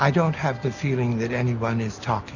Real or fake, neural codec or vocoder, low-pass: real; none; 7.2 kHz